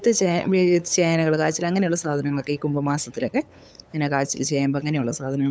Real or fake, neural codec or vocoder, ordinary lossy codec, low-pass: fake; codec, 16 kHz, 8 kbps, FunCodec, trained on LibriTTS, 25 frames a second; none; none